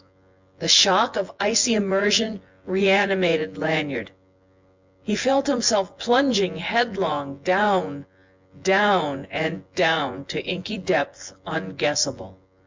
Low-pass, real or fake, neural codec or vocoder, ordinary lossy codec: 7.2 kHz; fake; vocoder, 24 kHz, 100 mel bands, Vocos; MP3, 64 kbps